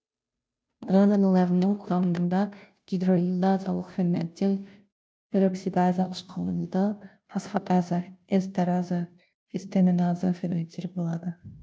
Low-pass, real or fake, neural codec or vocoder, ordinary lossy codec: none; fake; codec, 16 kHz, 0.5 kbps, FunCodec, trained on Chinese and English, 25 frames a second; none